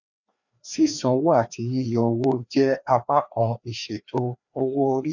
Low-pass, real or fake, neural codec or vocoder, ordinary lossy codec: 7.2 kHz; fake; codec, 16 kHz, 2 kbps, FreqCodec, larger model; none